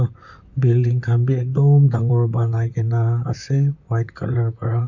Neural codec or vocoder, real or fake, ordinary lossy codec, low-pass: vocoder, 44.1 kHz, 128 mel bands, Pupu-Vocoder; fake; none; 7.2 kHz